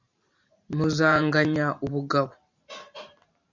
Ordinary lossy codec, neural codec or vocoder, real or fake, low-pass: MP3, 64 kbps; vocoder, 44.1 kHz, 128 mel bands every 512 samples, BigVGAN v2; fake; 7.2 kHz